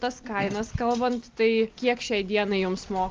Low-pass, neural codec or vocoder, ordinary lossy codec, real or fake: 7.2 kHz; none; Opus, 24 kbps; real